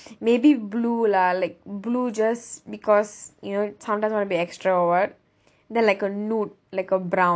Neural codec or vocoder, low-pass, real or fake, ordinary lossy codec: none; none; real; none